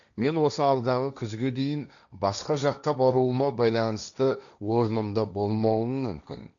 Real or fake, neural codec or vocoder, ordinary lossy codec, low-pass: fake; codec, 16 kHz, 1.1 kbps, Voila-Tokenizer; none; 7.2 kHz